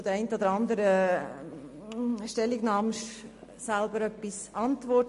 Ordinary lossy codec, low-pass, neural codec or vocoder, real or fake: MP3, 48 kbps; 14.4 kHz; none; real